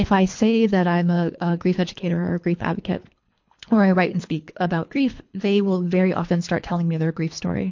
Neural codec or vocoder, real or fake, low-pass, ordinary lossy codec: codec, 24 kHz, 3 kbps, HILCodec; fake; 7.2 kHz; MP3, 48 kbps